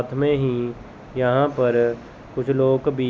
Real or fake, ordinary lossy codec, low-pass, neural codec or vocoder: real; none; none; none